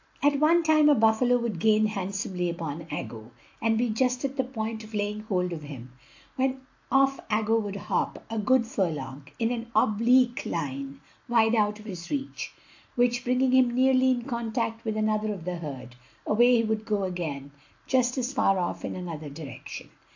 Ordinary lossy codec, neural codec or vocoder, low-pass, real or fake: AAC, 48 kbps; none; 7.2 kHz; real